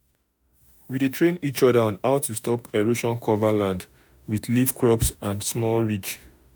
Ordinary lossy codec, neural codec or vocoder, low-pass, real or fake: none; autoencoder, 48 kHz, 32 numbers a frame, DAC-VAE, trained on Japanese speech; none; fake